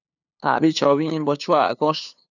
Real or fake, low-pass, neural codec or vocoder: fake; 7.2 kHz; codec, 16 kHz, 2 kbps, FunCodec, trained on LibriTTS, 25 frames a second